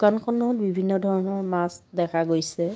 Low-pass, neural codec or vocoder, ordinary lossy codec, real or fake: none; codec, 16 kHz, 6 kbps, DAC; none; fake